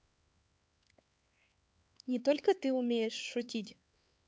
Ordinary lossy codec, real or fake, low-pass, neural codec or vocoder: none; fake; none; codec, 16 kHz, 4 kbps, X-Codec, HuBERT features, trained on LibriSpeech